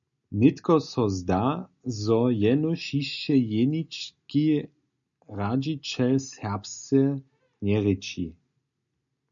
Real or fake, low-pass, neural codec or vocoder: real; 7.2 kHz; none